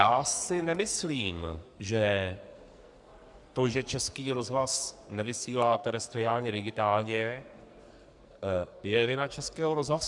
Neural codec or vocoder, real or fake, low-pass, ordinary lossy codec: codec, 44.1 kHz, 2.6 kbps, SNAC; fake; 10.8 kHz; Opus, 64 kbps